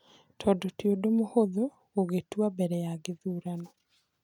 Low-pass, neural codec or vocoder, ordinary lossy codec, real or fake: 19.8 kHz; none; none; real